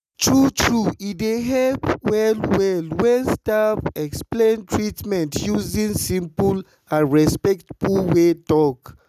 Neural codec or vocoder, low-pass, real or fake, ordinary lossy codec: none; 14.4 kHz; real; none